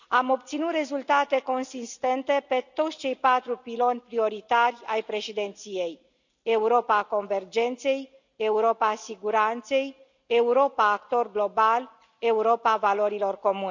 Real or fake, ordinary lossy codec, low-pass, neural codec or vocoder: real; none; 7.2 kHz; none